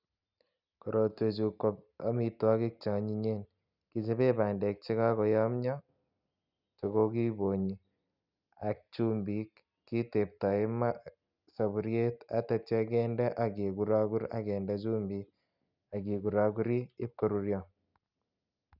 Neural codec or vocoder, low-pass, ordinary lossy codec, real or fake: none; 5.4 kHz; none; real